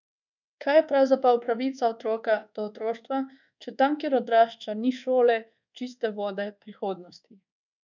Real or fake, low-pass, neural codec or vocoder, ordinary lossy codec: fake; 7.2 kHz; codec, 24 kHz, 1.2 kbps, DualCodec; none